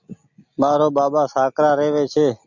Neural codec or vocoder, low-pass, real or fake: none; 7.2 kHz; real